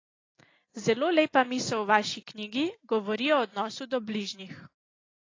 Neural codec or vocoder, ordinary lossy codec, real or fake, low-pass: none; AAC, 32 kbps; real; 7.2 kHz